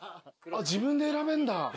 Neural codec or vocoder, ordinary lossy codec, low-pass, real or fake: none; none; none; real